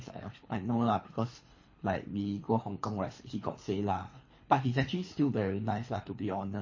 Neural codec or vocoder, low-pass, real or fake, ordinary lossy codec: codec, 24 kHz, 3 kbps, HILCodec; 7.2 kHz; fake; MP3, 32 kbps